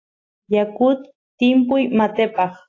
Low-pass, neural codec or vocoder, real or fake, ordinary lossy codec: 7.2 kHz; none; real; AAC, 32 kbps